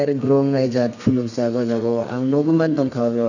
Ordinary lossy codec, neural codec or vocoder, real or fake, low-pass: none; codec, 32 kHz, 1.9 kbps, SNAC; fake; 7.2 kHz